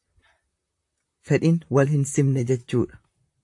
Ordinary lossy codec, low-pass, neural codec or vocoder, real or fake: AAC, 64 kbps; 10.8 kHz; vocoder, 44.1 kHz, 128 mel bands, Pupu-Vocoder; fake